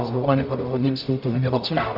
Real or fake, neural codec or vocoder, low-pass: fake; codec, 44.1 kHz, 0.9 kbps, DAC; 5.4 kHz